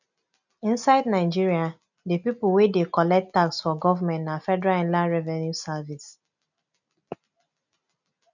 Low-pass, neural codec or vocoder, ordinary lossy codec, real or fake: 7.2 kHz; none; none; real